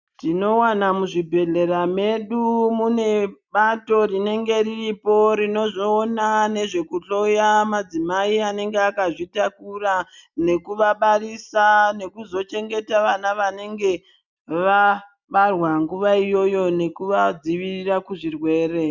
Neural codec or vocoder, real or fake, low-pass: none; real; 7.2 kHz